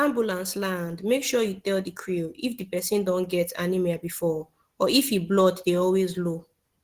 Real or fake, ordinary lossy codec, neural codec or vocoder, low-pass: real; Opus, 16 kbps; none; 14.4 kHz